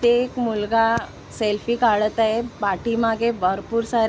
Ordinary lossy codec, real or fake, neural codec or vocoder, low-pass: none; real; none; none